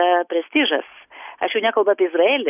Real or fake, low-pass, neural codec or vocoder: real; 3.6 kHz; none